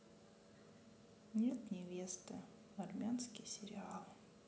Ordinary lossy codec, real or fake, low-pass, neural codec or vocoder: none; real; none; none